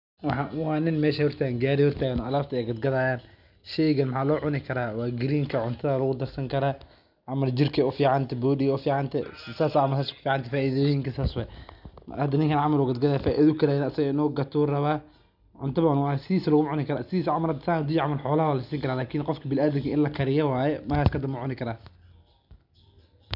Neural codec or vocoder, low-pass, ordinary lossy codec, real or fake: none; 5.4 kHz; none; real